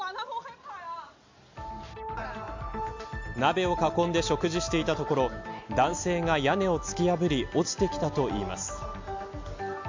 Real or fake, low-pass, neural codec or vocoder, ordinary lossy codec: real; 7.2 kHz; none; MP3, 64 kbps